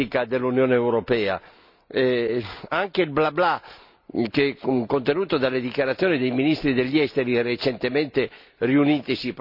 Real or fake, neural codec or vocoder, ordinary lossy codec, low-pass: real; none; none; 5.4 kHz